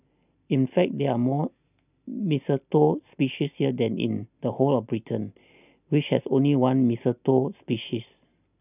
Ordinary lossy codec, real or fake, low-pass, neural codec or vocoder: none; real; 3.6 kHz; none